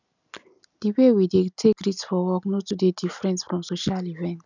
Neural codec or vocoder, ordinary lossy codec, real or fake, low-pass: none; none; real; 7.2 kHz